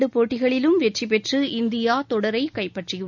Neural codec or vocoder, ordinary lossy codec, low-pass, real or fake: none; none; 7.2 kHz; real